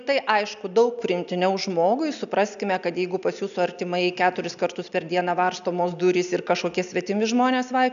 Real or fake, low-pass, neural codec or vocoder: real; 7.2 kHz; none